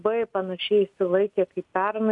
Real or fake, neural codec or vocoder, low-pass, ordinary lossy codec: real; none; 10.8 kHz; MP3, 96 kbps